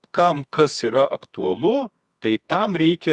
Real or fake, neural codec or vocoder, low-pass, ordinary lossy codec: fake; codec, 24 kHz, 0.9 kbps, WavTokenizer, medium music audio release; 10.8 kHz; Opus, 64 kbps